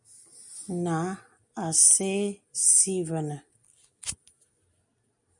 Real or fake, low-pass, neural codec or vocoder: real; 10.8 kHz; none